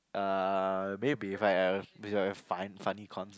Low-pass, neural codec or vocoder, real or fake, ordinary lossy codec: none; none; real; none